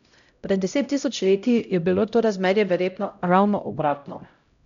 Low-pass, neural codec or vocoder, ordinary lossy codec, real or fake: 7.2 kHz; codec, 16 kHz, 0.5 kbps, X-Codec, HuBERT features, trained on LibriSpeech; none; fake